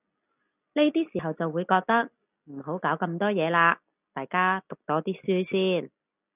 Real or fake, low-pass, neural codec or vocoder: real; 3.6 kHz; none